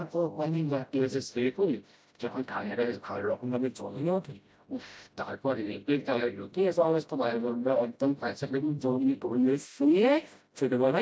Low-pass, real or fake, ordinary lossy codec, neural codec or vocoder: none; fake; none; codec, 16 kHz, 0.5 kbps, FreqCodec, smaller model